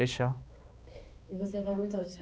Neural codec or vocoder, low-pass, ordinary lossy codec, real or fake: codec, 16 kHz, 2 kbps, X-Codec, HuBERT features, trained on balanced general audio; none; none; fake